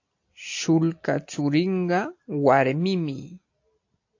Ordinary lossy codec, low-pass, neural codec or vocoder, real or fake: AAC, 48 kbps; 7.2 kHz; none; real